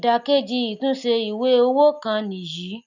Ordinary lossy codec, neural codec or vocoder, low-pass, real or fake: none; none; 7.2 kHz; real